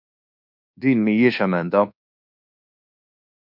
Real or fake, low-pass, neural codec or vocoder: fake; 5.4 kHz; codec, 24 kHz, 1.2 kbps, DualCodec